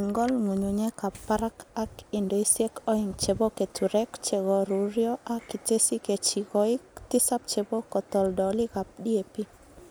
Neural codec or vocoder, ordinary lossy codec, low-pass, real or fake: none; none; none; real